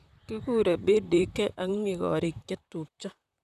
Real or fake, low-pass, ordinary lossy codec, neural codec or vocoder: fake; 14.4 kHz; none; vocoder, 44.1 kHz, 128 mel bands, Pupu-Vocoder